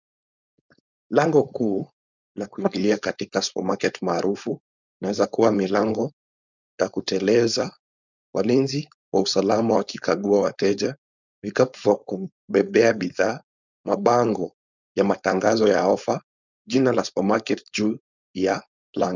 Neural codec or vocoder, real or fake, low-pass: codec, 16 kHz, 4.8 kbps, FACodec; fake; 7.2 kHz